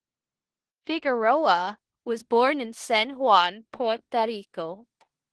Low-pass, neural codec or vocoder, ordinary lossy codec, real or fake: 10.8 kHz; codec, 16 kHz in and 24 kHz out, 0.9 kbps, LongCat-Audio-Codec, four codebook decoder; Opus, 16 kbps; fake